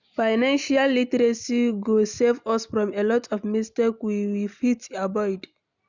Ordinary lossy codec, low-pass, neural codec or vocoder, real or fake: none; 7.2 kHz; none; real